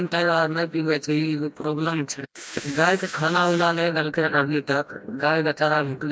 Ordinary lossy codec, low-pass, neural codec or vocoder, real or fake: none; none; codec, 16 kHz, 1 kbps, FreqCodec, smaller model; fake